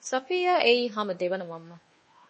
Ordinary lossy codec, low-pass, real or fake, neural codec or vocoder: MP3, 32 kbps; 10.8 kHz; fake; codec, 24 kHz, 1.2 kbps, DualCodec